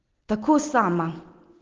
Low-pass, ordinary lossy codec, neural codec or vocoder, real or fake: 7.2 kHz; Opus, 16 kbps; none; real